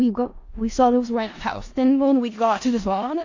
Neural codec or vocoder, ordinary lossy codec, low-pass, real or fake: codec, 16 kHz in and 24 kHz out, 0.4 kbps, LongCat-Audio-Codec, four codebook decoder; AAC, 48 kbps; 7.2 kHz; fake